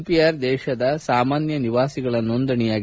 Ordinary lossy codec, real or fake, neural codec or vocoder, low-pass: none; real; none; none